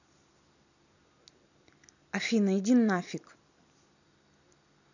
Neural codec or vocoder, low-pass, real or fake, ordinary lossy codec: vocoder, 44.1 kHz, 128 mel bands every 256 samples, BigVGAN v2; 7.2 kHz; fake; none